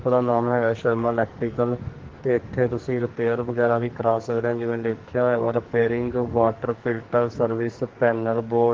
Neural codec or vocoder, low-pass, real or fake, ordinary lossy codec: codec, 44.1 kHz, 2.6 kbps, SNAC; 7.2 kHz; fake; Opus, 16 kbps